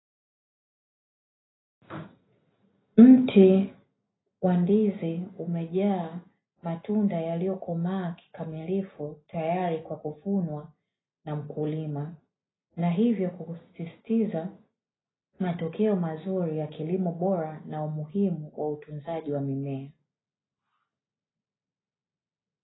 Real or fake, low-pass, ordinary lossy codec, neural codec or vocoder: real; 7.2 kHz; AAC, 16 kbps; none